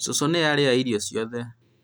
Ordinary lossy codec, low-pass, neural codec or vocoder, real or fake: none; none; none; real